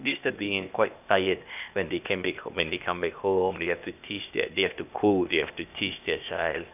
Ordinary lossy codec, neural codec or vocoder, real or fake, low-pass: none; codec, 16 kHz, 0.8 kbps, ZipCodec; fake; 3.6 kHz